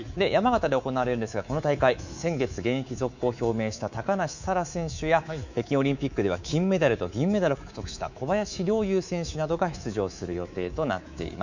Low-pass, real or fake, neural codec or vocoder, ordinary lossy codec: 7.2 kHz; fake; codec, 24 kHz, 3.1 kbps, DualCodec; none